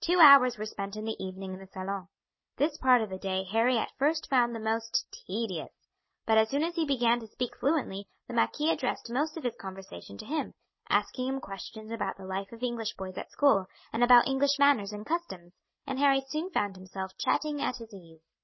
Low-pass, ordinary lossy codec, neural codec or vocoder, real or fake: 7.2 kHz; MP3, 24 kbps; none; real